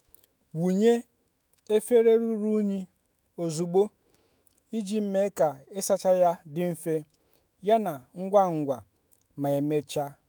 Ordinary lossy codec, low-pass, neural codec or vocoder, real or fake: none; none; autoencoder, 48 kHz, 128 numbers a frame, DAC-VAE, trained on Japanese speech; fake